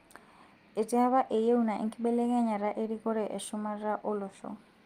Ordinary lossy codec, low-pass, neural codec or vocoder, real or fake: Opus, 32 kbps; 14.4 kHz; none; real